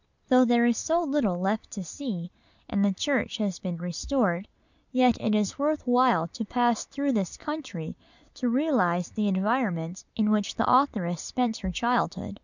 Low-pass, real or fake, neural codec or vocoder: 7.2 kHz; real; none